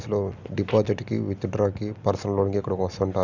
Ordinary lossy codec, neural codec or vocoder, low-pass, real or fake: none; none; 7.2 kHz; real